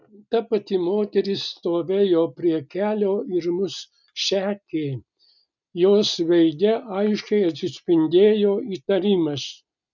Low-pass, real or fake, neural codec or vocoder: 7.2 kHz; real; none